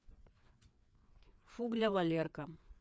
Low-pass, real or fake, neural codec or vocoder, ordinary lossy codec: none; fake; codec, 16 kHz, 4 kbps, FreqCodec, larger model; none